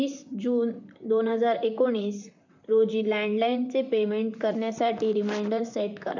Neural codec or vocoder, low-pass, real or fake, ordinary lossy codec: vocoder, 44.1 kHz, 128 mel bands, Pupu-Vocoder; 7.2 kHz; fake; none